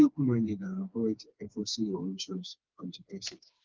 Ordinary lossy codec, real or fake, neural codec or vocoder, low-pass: Opus, 32 kbps; fake; codec, 16 kHz, 2 kbps, FreqCodec, smaller model; 7.2 kHz